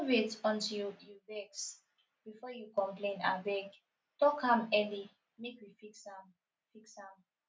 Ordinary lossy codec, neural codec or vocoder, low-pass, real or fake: none; none; none; real